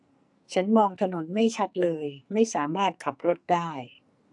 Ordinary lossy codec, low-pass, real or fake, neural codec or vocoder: AAC, 64 kbps; 10.8 kHz; fake; codec, 44.1 kHz, 2.6 kbps, SNAC